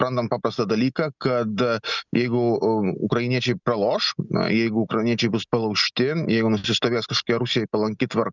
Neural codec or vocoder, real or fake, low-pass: none; real; 7.2 kHz